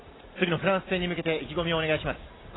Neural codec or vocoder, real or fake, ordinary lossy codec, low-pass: none; real; AAC, 16 kbps; 7.2 kHz